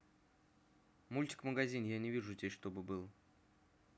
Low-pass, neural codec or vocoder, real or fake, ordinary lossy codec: none; none; real; none